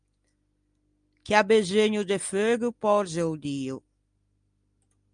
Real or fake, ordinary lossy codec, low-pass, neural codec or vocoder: real; Opus, 32 kbps; 9.9 kHz; none